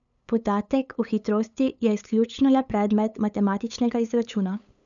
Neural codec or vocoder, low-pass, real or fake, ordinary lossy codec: codec, 16 kHz, 8 kbps, FunCodec, trained on LibriTTS, 25 frames a second; 7.2 kHz; fake; none